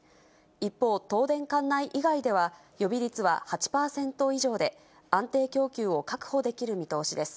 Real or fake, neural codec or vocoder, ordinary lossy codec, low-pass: real; none; none; none